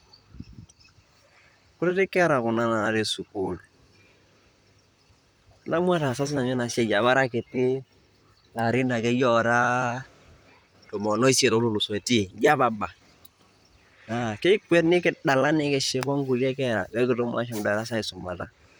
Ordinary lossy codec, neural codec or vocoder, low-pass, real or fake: none; vocoder, 44.1 kHz, 128 mel bands, Pupu-Vocoder; none; fake